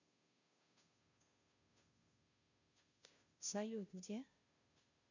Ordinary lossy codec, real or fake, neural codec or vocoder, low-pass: none; fake; codec, 16 kHz, 0.5 kbps, FunCodec, trained on Chinese and English, 25 frames a second; 7.2 kHz